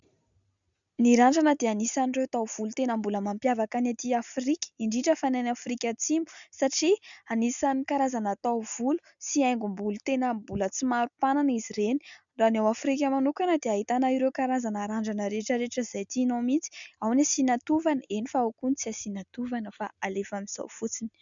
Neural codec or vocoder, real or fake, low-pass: none; real; 7.2 kHz